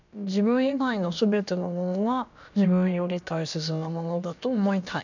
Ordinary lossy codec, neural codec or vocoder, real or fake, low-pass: none; codec, 16 kHz, about 1 kbps, DyCAST, with the encoder's durations; fake; 7.2 kHz